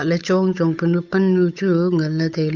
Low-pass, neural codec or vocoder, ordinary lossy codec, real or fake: 7.2 kHz; codec, 16 kHz, 8 kbps, FunCodec, trained on Chinese and English, 25 frames a second; none; fake